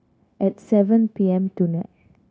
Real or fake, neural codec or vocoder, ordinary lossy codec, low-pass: fake; codec, 16 kHz, 0.9 kbps, LongCat-Audio-Codec; none; none